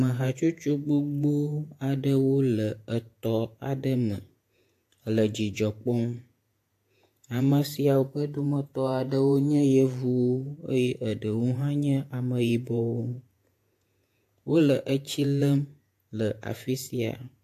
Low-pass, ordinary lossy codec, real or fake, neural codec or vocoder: 14.4 kHz; AAC, 64 kbps; fake; vocoder, 48 kHz, 128 mel bands, Vocos